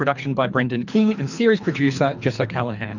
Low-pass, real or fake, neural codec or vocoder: 7.2 kHz; fake; codec, 24 kHz, 3 kbps, HILCodec